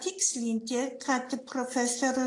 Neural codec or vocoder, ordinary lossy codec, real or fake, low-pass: none; AAC, 48 kbps; real; 10.8 kHz